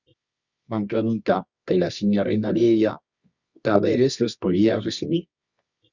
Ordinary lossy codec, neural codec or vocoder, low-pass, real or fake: Opus, 64 kbps; codec, 24 kHz, 0.9 kbps, WavTokenizer, medium music audio release; 7.2 kHz; fake